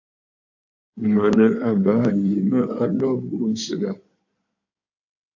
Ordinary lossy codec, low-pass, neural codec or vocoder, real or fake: AAC, 48 kbps; 7.2 kHz; codec, 16 kHz in and 24 kHz out, 2.2 kbps, FireRedTTS-2 codec; fake